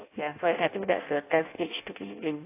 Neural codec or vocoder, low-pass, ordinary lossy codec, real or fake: codec, 16 kHz in and 24 kHz out, 0.6 kbps, FireRedTTS-2 codec; 3.6 kHz; AAC, 24 kbps; fake